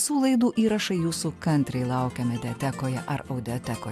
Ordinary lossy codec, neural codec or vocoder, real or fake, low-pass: AAC, 96 kbps; none; real; 14.4 kHz